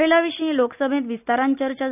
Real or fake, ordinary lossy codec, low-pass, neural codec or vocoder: real; none; 3.6 kHz; none